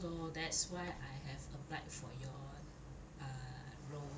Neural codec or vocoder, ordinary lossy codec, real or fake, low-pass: none; none; real; none